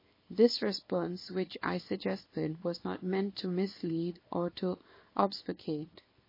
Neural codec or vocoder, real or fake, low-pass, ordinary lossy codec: codec, 24 kHz, 0.9 kbps, WavTokenizer, small release; fake; 5.4 kHz; MP3, 24 kbps